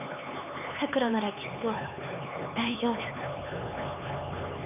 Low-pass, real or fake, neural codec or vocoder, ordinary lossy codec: 3.6 kHz; fake; codec, 16 kHz, 4 kbps, X-Codec, HuBERT features, trained on LibriSpeech; none